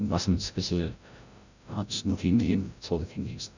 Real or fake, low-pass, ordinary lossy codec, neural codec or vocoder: fake; 7.2 kHz; none; codec, 16 kHz, 0.5 kbps, FreqCodec, larger model